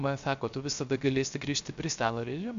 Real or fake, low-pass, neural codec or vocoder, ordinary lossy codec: fake; 7.2 kHz; codec, 16 kHz, 0.3 kbps, FocalCodec; MP3, 64 kbps